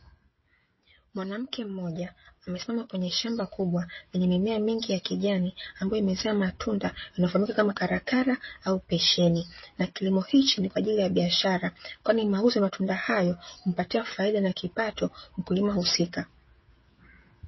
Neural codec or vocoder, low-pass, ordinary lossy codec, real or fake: codec, 16 kHz, 16 kbps, FreqCodec, smaller model; 7.2 kHz; MP3, 24 kbps; fake